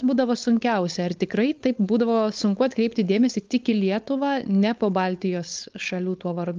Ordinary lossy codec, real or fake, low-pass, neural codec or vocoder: Opus, 32 kbps; fake; 7.2 kHz; codec, 16 kHz, 4.8 kbps, FACodec